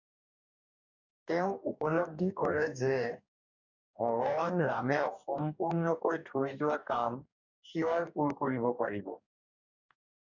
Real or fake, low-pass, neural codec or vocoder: fake; 7.2 kHz; codec, 44.1 kHz, 2.6 kbps, DAC